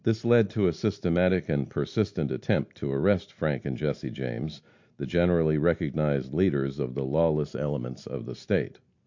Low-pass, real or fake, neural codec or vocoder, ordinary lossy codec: 7.2 kHz; real; none; MP3, 48 kbps